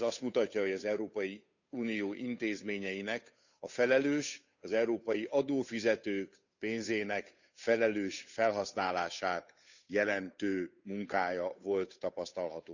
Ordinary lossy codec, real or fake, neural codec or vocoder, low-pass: none; fake; codec, 16 kHz, 8 kbps, FunCodec, trained on Chinese and English, 25 frames a second; 7.2 kHz